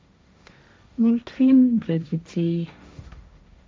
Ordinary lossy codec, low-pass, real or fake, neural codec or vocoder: none; none; fake; codec, 16 kHz, 1.1 kbps, Voila-Tokenizer